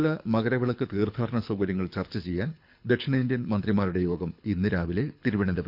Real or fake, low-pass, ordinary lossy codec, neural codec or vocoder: fake; 5.4 kHz; none; codec, 24 kHz, 6 kbps, HILCodec